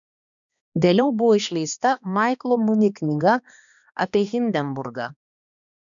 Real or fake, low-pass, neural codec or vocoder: fake; 7.2 kHz; codec, 16 kHz, 2 kbps, X-Codec, HuBERT features, trained on balanced general audio